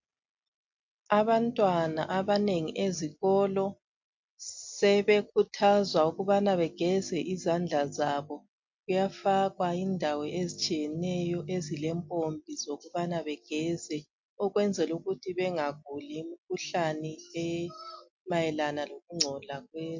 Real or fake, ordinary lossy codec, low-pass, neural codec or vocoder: real; MP3, 48 kbps; 7.2 kHz; none